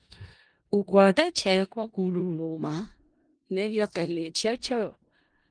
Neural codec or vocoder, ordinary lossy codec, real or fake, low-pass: codec, 16 kHz in and 24 kHz out, 0.4 kbps, LongCat-Audio-Codec, four codebook decoder; Opus, 24 kbps; fake; 9.9 kHz